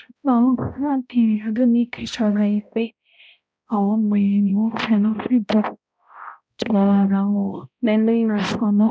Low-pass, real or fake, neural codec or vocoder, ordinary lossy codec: none; fake; codec, 16 kHz, 0.5 kbps, X-Codec, HuBERT features, trained on balanced general audio; none